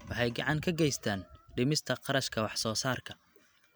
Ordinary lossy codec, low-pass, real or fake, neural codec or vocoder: none; none; real; none